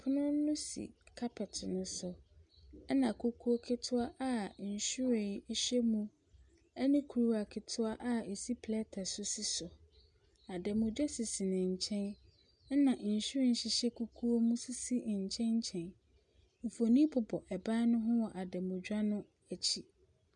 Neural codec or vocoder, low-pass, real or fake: none; 9.9 kHz; real